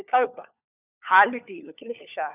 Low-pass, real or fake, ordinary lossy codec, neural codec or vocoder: 3.6 kHz; fake; none; codec, 16 kHz, 4 kbps, FunCodec, trained on LibriTTS, 50 frames a second